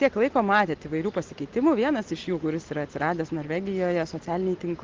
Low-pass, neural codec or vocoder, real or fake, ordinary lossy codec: 7.2 kHz; none; real; Opus, 16 kbps